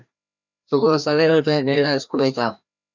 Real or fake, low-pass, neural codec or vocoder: fake; 7.2 kHz; codec, 16 kHz, 1 kbps, FreqCodec, larger model